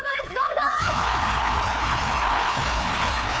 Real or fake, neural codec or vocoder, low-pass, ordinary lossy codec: fake; codec, 16 kHz, 2 kbps, FreqCodec, larger model; none; none